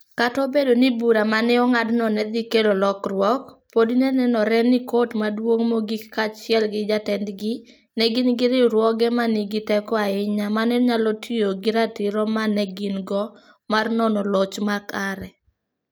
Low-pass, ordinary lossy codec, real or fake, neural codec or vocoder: none; none; real; none